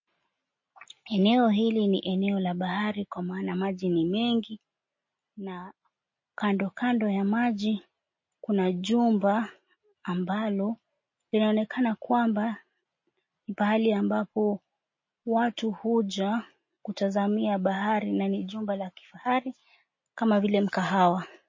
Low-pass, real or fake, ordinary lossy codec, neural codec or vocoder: 7.2 kHz; real; MP3, 32 kbps; none